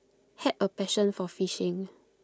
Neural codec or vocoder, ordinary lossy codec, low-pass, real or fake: none; none; none; real